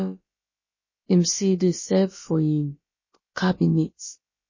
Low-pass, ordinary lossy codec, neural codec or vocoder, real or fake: 7.2 kHz; MP3, 32 kbps; codec, 16 kHz, about 1 kbps, DyCAST, with the encoder's durations; fake